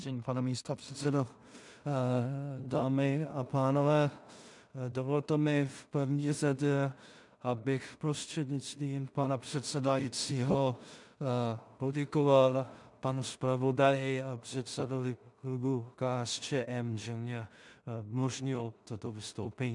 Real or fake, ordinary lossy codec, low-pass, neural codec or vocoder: fake; MP3, 96 kbps; 10.8 kHz; codec, 16 kHz in and 24 kHz out, 0.4 kbps, LongCat-Audio-Codec, two codebook decoder